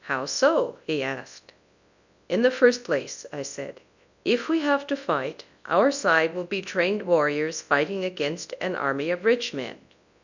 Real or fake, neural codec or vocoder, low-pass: fake; codec, 24 kHz, 0.9 kbps, WavTokenizer, large speech release; 7.2 kHz